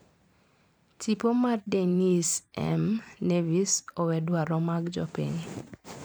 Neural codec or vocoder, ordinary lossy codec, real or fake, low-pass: vocoder, 44.1 kHz, 128 mel bands every 512 samples, BigVGAN v2; none; fake; none